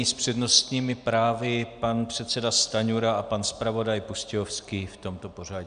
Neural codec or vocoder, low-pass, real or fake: none; 9.9 kHz; real